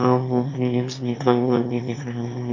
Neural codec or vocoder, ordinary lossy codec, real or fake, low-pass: autoencoder, 22.05 kHz, a latent of 192 numbers a frame, VITS, trained on one speaker; none; fake; 7.2 kHz